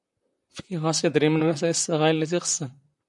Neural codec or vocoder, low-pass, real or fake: vocoder, 44.1 kHz, 128 mel bands, Pupu-Vocoder; 10.8 kHz; fake